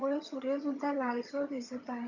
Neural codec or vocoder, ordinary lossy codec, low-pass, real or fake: vocoder, 22.05 kHz, 80 mel bands, HiFi-GAN; none; 7.2 kHz; fake